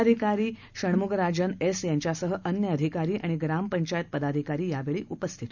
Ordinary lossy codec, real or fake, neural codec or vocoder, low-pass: none; real; none; 7.2 kHz